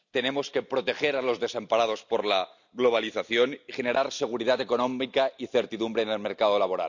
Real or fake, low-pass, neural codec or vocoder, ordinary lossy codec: real; 7.2 kHz; none; none